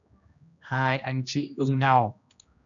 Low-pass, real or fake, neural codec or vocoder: 7.2 kHz; fake; codec, 16 kHz, 1 kbps, X-Codec, HuBERT features, trained on general audio